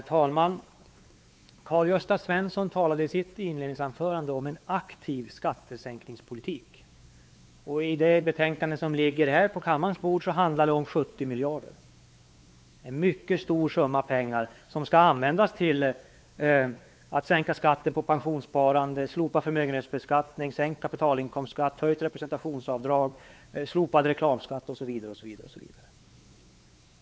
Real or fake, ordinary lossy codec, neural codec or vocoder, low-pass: fake; none; codec, 16 kHz, 4 kbps, X-Codec, WavLM features, trained on Multilingual LibriSpeech; none